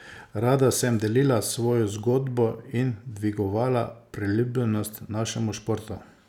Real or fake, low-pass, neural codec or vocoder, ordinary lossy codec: real; 19.8 kHz; none; none